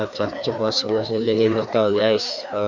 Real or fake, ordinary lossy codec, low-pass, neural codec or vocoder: fake; none; 7.2 kHz; codec, 16 kHz, 2 kbps, FreqCodec, larger model